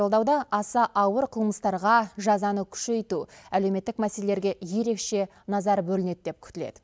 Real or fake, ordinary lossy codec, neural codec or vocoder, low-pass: real; none; none; none